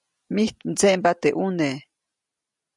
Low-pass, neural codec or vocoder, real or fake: 10.8 kHz; none; real